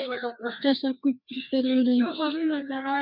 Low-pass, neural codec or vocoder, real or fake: 5.4 kHz; codec, 16 kHz, 2 kbps, FreqCodec, larger model; fake